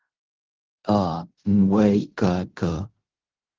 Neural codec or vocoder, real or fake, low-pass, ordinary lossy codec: codec, 16 kHz in and 24 kHz out, 0.4 kbps, LongCat-Audio-Codec, fine tuned four codebook decoder; fake; 7.2 kHz; Opus, 16 kbps